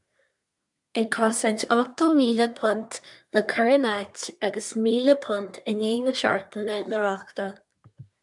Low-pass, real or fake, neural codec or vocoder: 10.8 kHz; fake; codec, 24 kHz, 1 kbps, SNAC